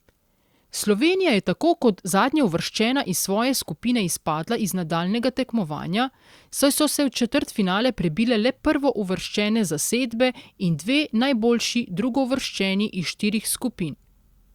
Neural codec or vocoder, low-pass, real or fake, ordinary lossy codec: none; 19.8 kHz; real; Opus, 64 kbps